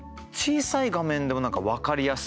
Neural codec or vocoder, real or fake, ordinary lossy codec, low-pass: none; real; none; none